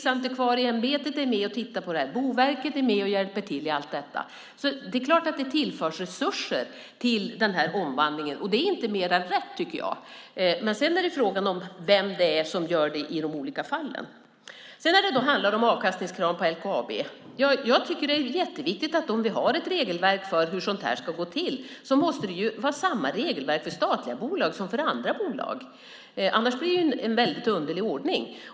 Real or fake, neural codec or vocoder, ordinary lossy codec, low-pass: real; none; none; none